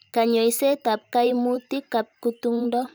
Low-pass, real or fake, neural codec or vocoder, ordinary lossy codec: none; fake; vocoder, 44.1 kHz, 128 mel bands every 512 samples, BigVGAN v2; none